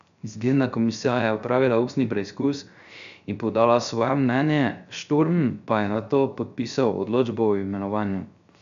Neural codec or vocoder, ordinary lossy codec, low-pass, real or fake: codec, 16 kHz, 0.3 kbps, FocalCodec; AAC, 96 kbps; 7.2 kHz; fake